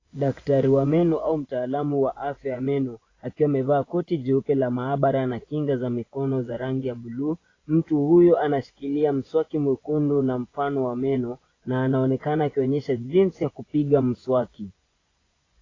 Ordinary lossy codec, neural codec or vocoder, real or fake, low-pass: AAC, 32 kbps; vocoder, 24 kHz, 100 mel bands, Vocos; fake; 7.2 kHz